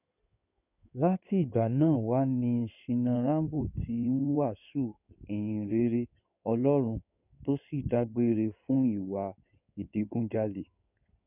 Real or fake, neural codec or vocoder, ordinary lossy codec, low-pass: fake; codec, 16 kHz in and 24 kHz out, 2.2 kbps, FireRedTTS-2 codec; none; 3.6 kHz